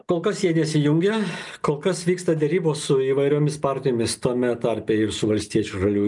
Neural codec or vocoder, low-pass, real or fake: none; 10.8 kHz; real